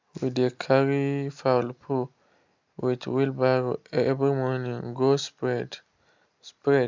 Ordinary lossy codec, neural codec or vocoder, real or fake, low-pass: MP3, 64 kbps; none; real; 7.2 kHz